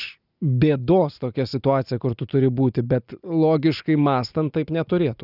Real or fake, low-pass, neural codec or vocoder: real; 5.4 kHz; none